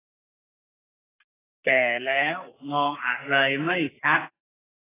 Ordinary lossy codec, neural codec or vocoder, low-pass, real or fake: AAC, 16 kbps; codec, 32 kHz, 1.9 kbps, SNAC; 3.6 kHz; fake